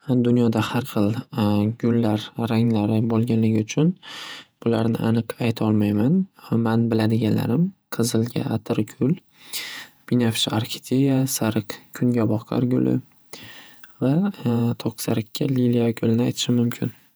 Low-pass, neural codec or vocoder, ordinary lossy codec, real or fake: none; vocoder, 48 kHz, 128 mel bands, Vocos; none; fake